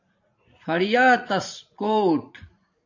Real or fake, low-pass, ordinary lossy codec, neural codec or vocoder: fake; 7.2 kHz; AAC, 48 kbps; vocoder, 44.1 kHz, 80 mel bands, Vocos